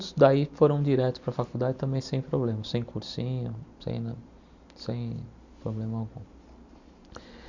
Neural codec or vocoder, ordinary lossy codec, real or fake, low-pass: none; Opus, 64 kbps; real; 7.2 kHz